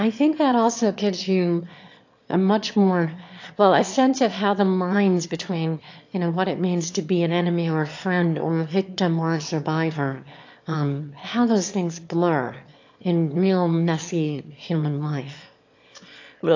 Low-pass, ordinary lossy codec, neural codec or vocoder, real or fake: 7.2 kHz; AAC, 48 kbps; autoencoder, 22.05 kHz, a latent of 192 numbers a frame, VITS, trained on one speaker; fake